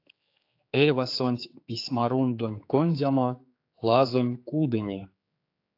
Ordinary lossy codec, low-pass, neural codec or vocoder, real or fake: AAC, 32 kbps; 5.4 kHz; codec, 16 kHz, 4 kbps, X-Codec, HuBERT features, trained on general audio; fake